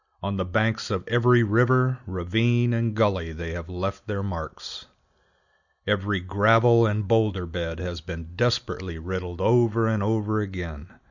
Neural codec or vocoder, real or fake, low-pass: none; real; 7.2 kHz